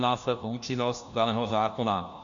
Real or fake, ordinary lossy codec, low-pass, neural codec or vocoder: fake; Opus, 64 kbps; 7.2 kHz; codec, 16 kHz, 1 kbps, FunCodec, trained on LibriTTS, 50 frames a second